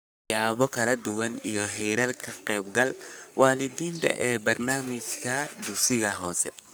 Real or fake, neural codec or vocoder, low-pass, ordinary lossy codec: fake; codec, 44.1 kHz, 3.4 kbps, Pupu-Codec; none; none